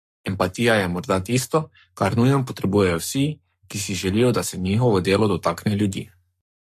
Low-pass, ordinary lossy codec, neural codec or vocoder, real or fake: 14.4 kHz; MP3, 64 kbps; codec, 44.1 kHz, 7.8 kbps, Pupu-Codec; fake